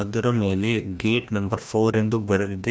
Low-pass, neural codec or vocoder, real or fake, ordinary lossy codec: none; codec, 16 kHz, 1 kbps, FreqCodec, larger model; fake; none